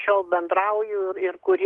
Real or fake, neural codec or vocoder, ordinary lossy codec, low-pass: real; none; Opus, 16 kbps; 10.8 kHz